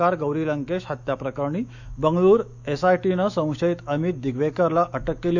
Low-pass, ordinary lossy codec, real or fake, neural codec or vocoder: 7.2 kHz; none; fake; autoencoder, 48 kHz, 128 numbers a frame, DAC-VAE, trained on Japanese speech